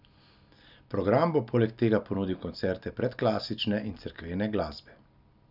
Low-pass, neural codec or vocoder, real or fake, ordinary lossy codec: 5.4 kHz; none; real; none